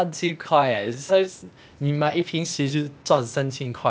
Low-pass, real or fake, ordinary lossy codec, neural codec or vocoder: none; fake; none; codec, 16 kHz, 0.8 kbps, ZipCodec